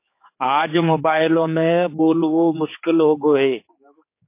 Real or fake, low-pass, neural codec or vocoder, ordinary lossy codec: fake; 3.6 kHz; codec, 16 kHz, 4 kbps, X-Codec, HuBERT features, trained on general audio; MP3, 24 kbps